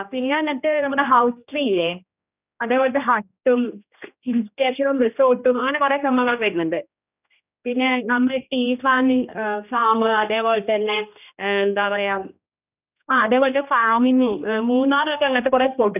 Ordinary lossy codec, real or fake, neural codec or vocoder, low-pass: none; fake; codec, 16 kHz, 1 kbps, X-Codec, HuBERT features, trained on general audio; 3.6 kHz